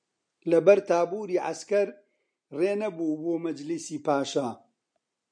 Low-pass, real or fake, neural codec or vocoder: 9.9 kHz; real; none